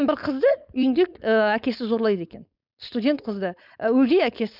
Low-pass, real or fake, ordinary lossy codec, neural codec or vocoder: 5.4 kHz; fake; none; codec, 16 kHz, 4.8 kbps, FACodec